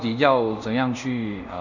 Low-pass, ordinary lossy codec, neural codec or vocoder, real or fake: 7.2 kHz; none; codec, 24 kHz, 0.5 kbps, DualCodec; fake